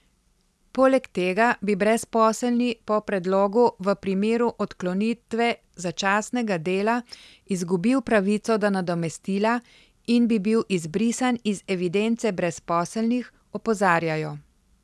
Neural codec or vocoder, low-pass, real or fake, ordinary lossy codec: none; none; real; none